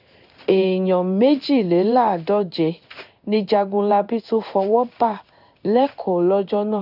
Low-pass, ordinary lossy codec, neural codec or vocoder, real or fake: 5.4 kHz; none; codec, 16 kHz in and 24 kHz out, 1 kbps, XY-Tokenizer; fake